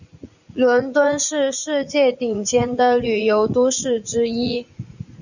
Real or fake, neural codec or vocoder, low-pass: fake; vocoder, 44.1 kHz, 80 mel bands, Vocos; 7.2 kHz